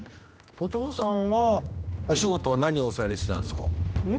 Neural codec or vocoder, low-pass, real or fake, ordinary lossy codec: codec, 16 kHz, 1 kbps, X-Codec, HuBERT features, trained on general audio; none; fake; none